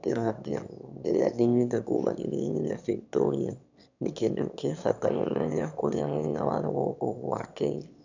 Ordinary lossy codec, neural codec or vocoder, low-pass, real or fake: none; autoencoder, 22.05 kHz, a latent of 192 numbers a frame, VITS, trained on one speaker; 7.2 kHz; fake